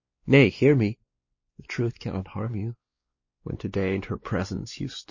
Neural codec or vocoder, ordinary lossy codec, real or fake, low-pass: codec, 16 kHz, 2 kbps, X-Codec, WavLM features, trained on Multilingual LibriSpeech; MP3, 32 kbps; fake; 7.2 kHz